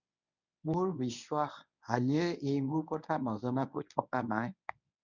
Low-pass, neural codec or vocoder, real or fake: 7.2 kHz; codec, 24 kHz, 0.9 kbps, WavTokenizer, medium speech release version 1; fake